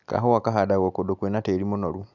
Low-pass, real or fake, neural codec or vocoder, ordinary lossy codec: 7.2 kHz; real; none; none